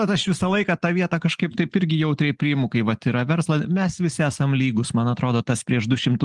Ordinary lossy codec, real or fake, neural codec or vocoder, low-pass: Opus, 24 kbps; real; none; 10.8 kHz